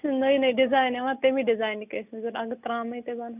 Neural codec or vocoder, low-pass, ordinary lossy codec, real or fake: none; 3.6 kHz; none; real